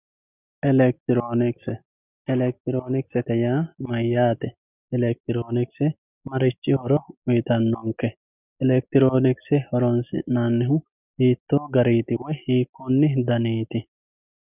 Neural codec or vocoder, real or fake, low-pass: none; real; 3.6 kHz